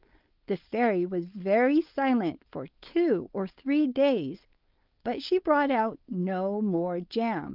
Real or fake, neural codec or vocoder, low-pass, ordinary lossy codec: fake; codec, 16 kHz, 4.8 kbps, FACodec; 5.4 kHz; Opus, 32 kbps